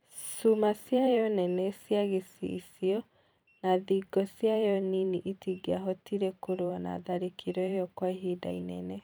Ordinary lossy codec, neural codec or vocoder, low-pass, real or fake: none; vocoder, 44.1 kHz, 128 mel bands every 512 samples, BigVGAN v2; none; fake